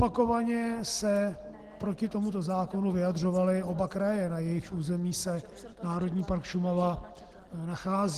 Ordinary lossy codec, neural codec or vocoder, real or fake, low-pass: Opus, 16 kbps; none; real; 14.4 kHz